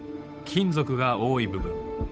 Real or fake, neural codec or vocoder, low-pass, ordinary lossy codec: fake; codec, 16 kHz, 8 kbps, FunCodec, trained on Chinese and English, 25 frames a second; none; none